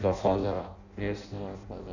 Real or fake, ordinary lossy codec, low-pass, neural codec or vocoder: fake; none; 7.2 kHz; codec, 16 kHz in and 24 kHz out, 0.6 kbps, FireRedTTS-2 codec